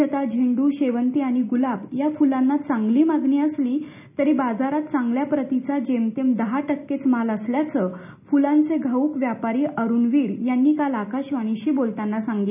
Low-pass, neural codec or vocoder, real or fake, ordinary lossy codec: 3.6 kHz; none; real; none